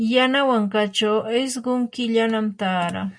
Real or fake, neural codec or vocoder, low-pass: real; none; 9.9 kHz